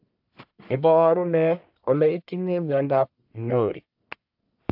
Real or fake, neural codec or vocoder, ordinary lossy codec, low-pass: fake; codec, 44.1 kHz, 1.7 kbps, Pupu-Codec; AAC, 48 kbps; 5.4 kHz